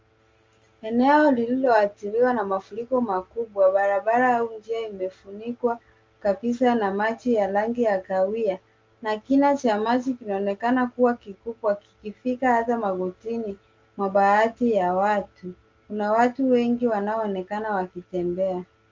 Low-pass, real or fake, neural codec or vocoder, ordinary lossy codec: 7.2 kHz; real; none; Opus, 32 kbps